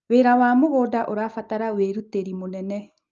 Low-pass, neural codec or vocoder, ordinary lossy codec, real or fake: 7.2 kHz; none; Opus, 24 kbps; real